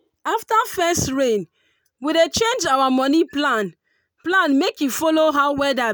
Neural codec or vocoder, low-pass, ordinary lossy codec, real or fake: none; none; none; real